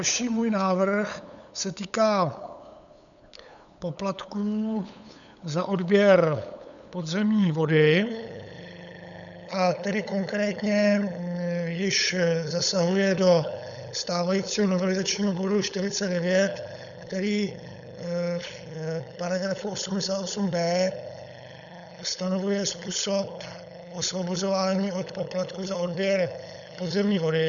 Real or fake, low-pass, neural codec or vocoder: fake; 7.2 kHz; codec, 16 kHz, 8 kbps, FunCodec, trained on LibriTTS, 25 frames a second